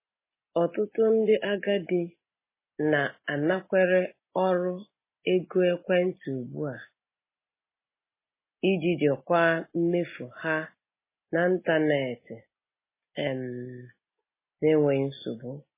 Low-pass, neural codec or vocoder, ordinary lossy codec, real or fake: 3.6 kHz; none; MP3, 16 kbps; real